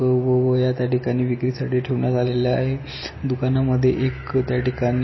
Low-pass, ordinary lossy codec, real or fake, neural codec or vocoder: 7.2 kHz; MP3, 24 kbps; real; none